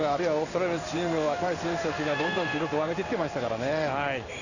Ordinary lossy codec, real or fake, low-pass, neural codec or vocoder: none; fake; 7.2 kHz; codec, 16 kHz in and 24 kHz out, 1 kbps, XY-Tokenizer